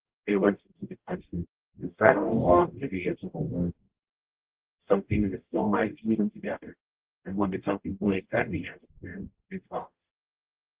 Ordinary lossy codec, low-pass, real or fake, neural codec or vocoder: Opus, 16 kbps; 3.6 kHz; fake; codec, 44.1 kHz, 0.9 kbps, DAC